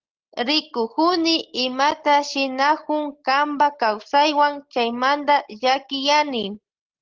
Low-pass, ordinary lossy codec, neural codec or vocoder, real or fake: 7.2 kHz; Opus, 16 kbps; none; real